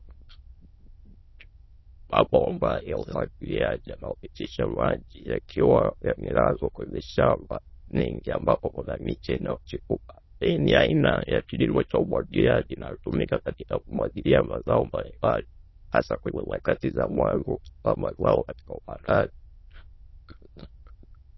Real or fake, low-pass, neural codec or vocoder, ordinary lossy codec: fake; 7.2 kHz; autoencoder, 22.05 kHz, a latent of 192 numbers a frame, VITS, trained on many speakers; MP3, 24 kbps